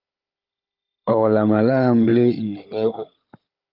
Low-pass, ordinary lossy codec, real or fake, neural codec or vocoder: 5.4 kHz; Opus, 24 kbps; fake; codec, 16 kHz, 16 kbps, FunCodec, trained on Chinese and English, 50 frames a second